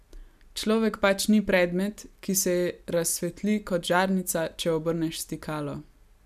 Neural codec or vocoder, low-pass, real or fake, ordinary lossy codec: none; 14.4 kHz; real; none